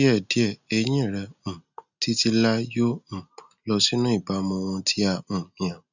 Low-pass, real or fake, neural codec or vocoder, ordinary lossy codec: 7.2 kHz; real; none; none